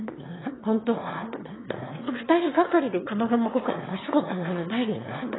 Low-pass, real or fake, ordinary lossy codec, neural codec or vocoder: 7.2 kHz; fake; AAC, 16 kbps; autoencoder, 22.05 kHz, a latent of 192 numbers a frame, VITS, trained on one speaker